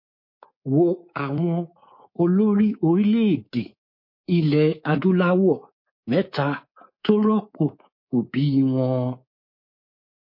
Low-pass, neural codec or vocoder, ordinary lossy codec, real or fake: 5.4 kHz; codec, 24 kHz, 3.1 kbps, DualCodec; MP3, 32 kbps; fake